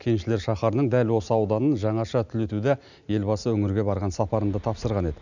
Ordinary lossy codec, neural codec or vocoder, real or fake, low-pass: none; none; real; 7.2 kHz